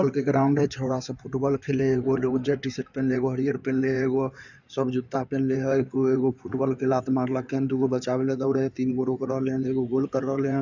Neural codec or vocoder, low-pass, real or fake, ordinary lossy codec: codec, 16 kHz in and 24 kHz out, 2.2 kbps, FireRedTTS-2 codec; 7.2 kHz; fake; none